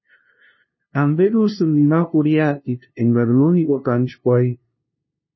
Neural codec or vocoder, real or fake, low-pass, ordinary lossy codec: codec, 16 kHz, 0.5 kbps, FunCodec, trained on LibriTTS, 25 frames a second; fake; 7.2 kHz; MP3, 24 kbps